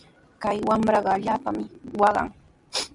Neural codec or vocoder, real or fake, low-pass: vocoder, 44.1 kHz, 128 mel bands every 256 samples, BigVGAN v2; fake; 10.8 kHz